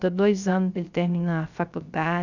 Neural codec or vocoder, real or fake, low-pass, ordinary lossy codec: codec, 16 kHz, 0.3 kbps, FocalCodec; fake; 7.2 kHz; none